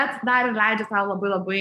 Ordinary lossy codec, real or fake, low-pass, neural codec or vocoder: AAC, 96 kbps; real; 14.4 kHz; none